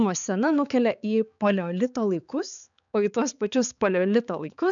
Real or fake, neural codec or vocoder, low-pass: fake; codec, 16 kHz, 4 kbps, X-Codec, HuBERT features, trained on balanced general audio; 7.2 kHz